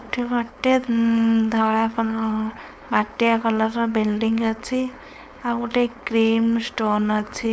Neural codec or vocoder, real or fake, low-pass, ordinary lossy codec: codec, 16 kHz, 4.8 kbps, FACodec; fake; none; none